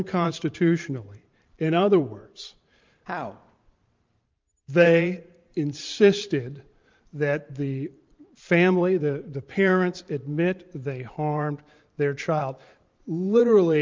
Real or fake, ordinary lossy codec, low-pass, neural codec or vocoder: fake; Opus, 24 kbps; 7.2 kHz; vocoder, 44.1 kHz, 128 mel bands every 512 samples, BigVGAN v2